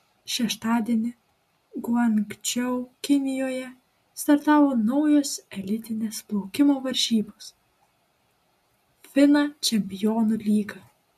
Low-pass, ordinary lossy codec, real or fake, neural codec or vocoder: 14.4 kHz; MP3, 64 kbps; real; none